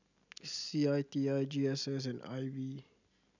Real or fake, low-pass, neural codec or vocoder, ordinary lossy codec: real; 7.2 kHz; none; none